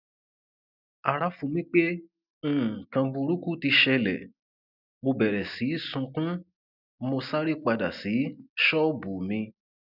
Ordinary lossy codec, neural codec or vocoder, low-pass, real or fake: none; none; 5.4 kHz; real